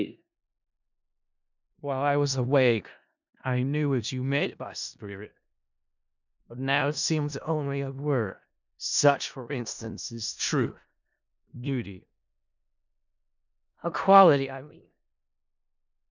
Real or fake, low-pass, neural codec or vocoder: fake; 7.2 kHz; codec, 16 kHz in and 24 kHz out, 0.4 kbps, LongCat-Audio-Codec, four codebook decoder